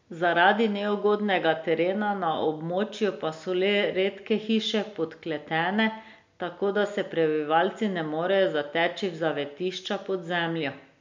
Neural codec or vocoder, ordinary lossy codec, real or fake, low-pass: none; MP3, 64 kbps; real; 7.2 kHz